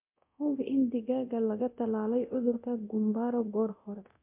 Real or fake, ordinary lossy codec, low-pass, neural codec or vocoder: fake; MP3, 32 kbps; 3.6 kHz; codec, 24 kHz, 0.9 kbps, DualCodec